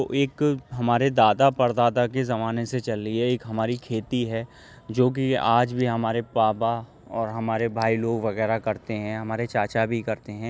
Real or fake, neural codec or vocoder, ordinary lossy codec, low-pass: real; none; none; none